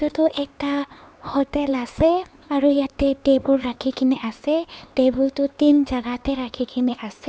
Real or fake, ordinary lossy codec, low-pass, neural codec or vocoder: fake; none; none; codec, 16 kHz, 4 kbps, X-Codec, HuBERT features, trained on LibriSpeech